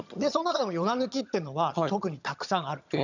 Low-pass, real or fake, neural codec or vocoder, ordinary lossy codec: 7.2 kHz; fake; vocoder, 22.05 kHz, 80 mel bands, HiFi-GAN; none